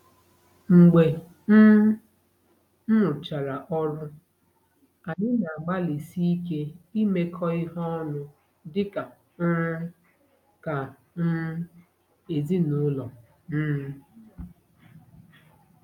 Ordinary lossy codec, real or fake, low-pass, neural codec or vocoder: none; real; 19.8 kHz; none